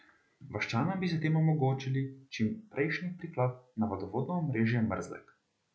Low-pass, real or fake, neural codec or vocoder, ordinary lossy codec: none; real; none; none